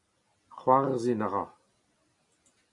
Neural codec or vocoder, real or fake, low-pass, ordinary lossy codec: none; real; 10.8 kHz; MP3, 48 kbps